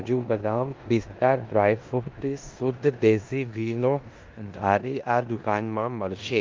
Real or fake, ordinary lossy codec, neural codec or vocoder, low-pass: fake; Opus, 32 kbps; codec, 16 kHz in and 24 kHz out, 0.9 kbps, LongCat-Audio-Codec, four codebook decoder; 7.2 kHz